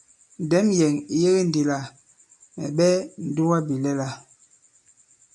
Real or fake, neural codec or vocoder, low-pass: real; none; 10.8 kHz